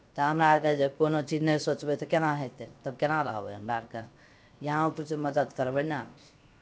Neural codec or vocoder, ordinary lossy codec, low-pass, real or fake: codec, 16 kHz, 0.7 kbps, FocalCodec; none; none; fake